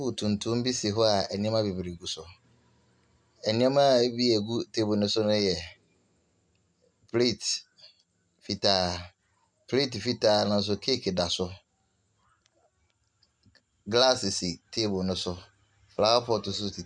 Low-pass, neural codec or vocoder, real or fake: 9.9 kHz; none; real